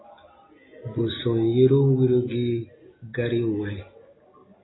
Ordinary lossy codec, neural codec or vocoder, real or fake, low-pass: AAC, 16 kbps; none; real; 7.2 kHz